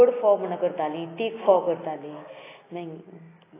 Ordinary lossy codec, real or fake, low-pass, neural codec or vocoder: AAC, 16 kbps; real; 3.6 kHz; none